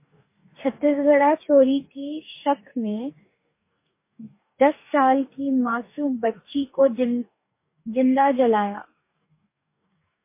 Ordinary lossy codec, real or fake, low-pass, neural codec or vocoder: MP3, 24 kbps; fake; 3.6 kHz; codec, 44.1 kHz, 2.6 kbps, DAC